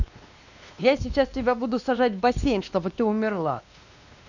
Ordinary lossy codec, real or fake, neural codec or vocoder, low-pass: none; fake; codec, 16 kHz, 2 kbps, X-Codec, WavLM features, trained on Multilingual LibriSpeech; 7.2 kHz